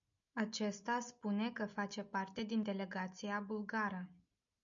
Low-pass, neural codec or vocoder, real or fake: 7.2 kHz; none; real